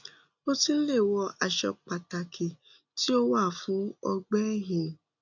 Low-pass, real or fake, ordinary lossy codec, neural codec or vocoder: 7.2 kHz; real; none; none